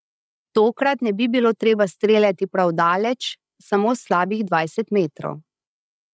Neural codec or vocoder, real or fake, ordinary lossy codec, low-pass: codec, 16 kHz, 16 kbps, FreqCodec, larger model; fake; none; none